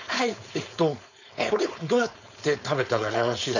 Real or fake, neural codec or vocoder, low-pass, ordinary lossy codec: fake; codec, 16 kHz, 4.8 kbps, FACodec; 7.2 kHz; none